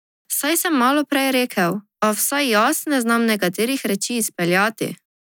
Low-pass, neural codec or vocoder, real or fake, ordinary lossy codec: none; none; real; none